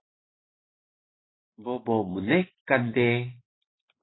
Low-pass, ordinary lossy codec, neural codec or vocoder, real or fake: 7.2 kHz; AAC, 16 kbps; vocoder, 44.1 kHz, 128 mel bands every 512 samples, BigVGAN v2; fake